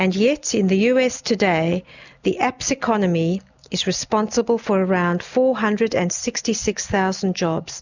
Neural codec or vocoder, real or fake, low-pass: none; real; 7.2 kHz